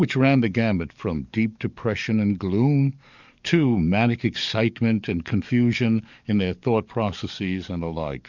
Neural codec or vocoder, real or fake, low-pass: codec, 44.1 kHz, 7.8 kbps, DAC; fake; 7.2 kHz